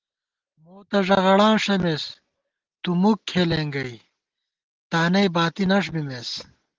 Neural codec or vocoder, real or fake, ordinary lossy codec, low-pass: none; real; Opus, 16 kbps; 7.2 kHz